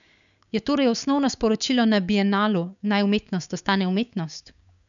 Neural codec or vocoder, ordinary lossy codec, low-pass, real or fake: codec, 16 kHz, 6 kbps, DAC; none; 7.2 kHz; fake